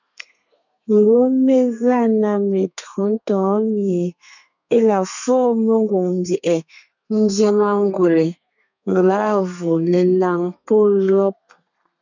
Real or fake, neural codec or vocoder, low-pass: fake; codec, 32 kHz, 1.9 kbps, SNAC; 7.2 kHz